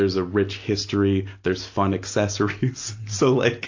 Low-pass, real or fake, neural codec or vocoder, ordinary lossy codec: 7.2 kHz; real; none; AAC, 48 kbps